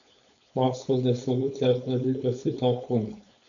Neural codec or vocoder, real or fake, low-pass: codec, 16 kHz, 4.8 kbps, FACodec; fake; 7.2 kHz